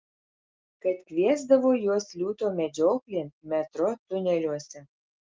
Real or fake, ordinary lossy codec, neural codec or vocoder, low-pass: real; Opus, 32 kbps; none; 7.2 kHz